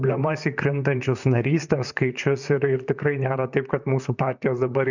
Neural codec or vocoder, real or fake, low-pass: vocoder, 44.1 kHz, 128 mel bands, Pupu-Vocoder; fake; 7.2 kHz